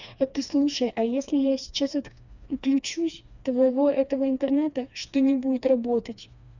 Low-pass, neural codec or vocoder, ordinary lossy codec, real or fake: 7.2 kHz; codec, 16 kHz, 2 kbps, FreqCodec, smaller model; none; fake